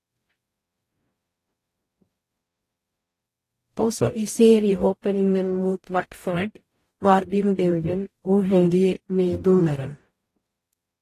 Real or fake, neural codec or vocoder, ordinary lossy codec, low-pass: fake; codec, 44.1 kHz, 0.9 kbps, DAC; AAC, 48 kbps; 14.4 kHz